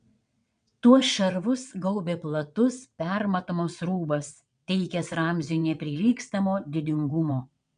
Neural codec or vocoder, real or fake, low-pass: vocoder, 22.05 kHz, 80 mel bands, WaveNeXt; fake; 9.9 kHz